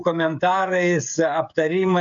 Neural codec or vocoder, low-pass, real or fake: codec, 16 kHz, 16 kbps, FreqCodec, smaller model; 7.2 kHz; fake